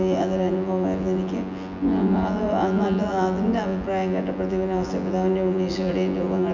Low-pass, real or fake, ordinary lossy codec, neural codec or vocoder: 7.2 kHz; fake; none; vocoder, 24 kHz, 100 mel bands, Vocos